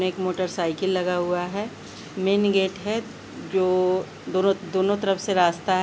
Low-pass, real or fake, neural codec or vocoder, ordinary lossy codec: none; real; none; none